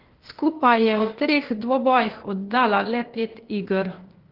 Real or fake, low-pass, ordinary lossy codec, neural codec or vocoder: fake; 5.4 kHz; Opus, 16 kbps; codec, 16 kHz, 0.8 kbps, ZipCodec